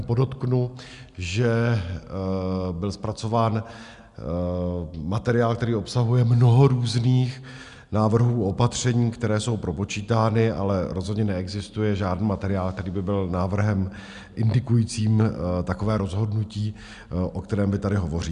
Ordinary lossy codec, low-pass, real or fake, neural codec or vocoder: MP3, 96 kbps; 10.8 kHz; real; none